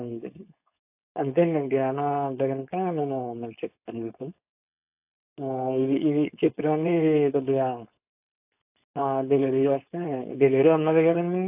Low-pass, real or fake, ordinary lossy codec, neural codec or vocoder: 3.6 kHz; fake; AAC, 32 kbps; codec, 16 kHz, 4.8 kbps, FACodec